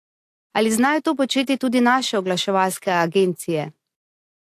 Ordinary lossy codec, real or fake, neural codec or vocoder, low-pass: AAC, 64 kbps; real; none; 14.4 kHz